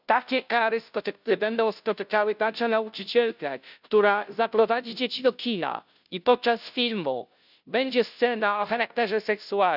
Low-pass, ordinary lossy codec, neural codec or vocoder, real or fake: 5.4 kHz; none; codec, 16 kHz, 0.5 kbps, FunCodec, trained on Chinese and English, 25 frames a second; fake